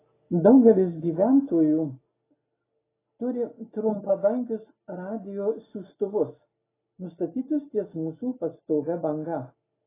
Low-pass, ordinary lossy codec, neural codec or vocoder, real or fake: 3.6 kHz; AAC, 16 kbps; none; real